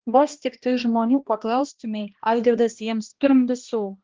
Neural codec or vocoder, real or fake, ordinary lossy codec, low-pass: codec, 16 kHz, 1 kbps, X-Codec, HuBERT features, trained on balanced general audio; fake; Opus, 16 kbps; 7.2 kHz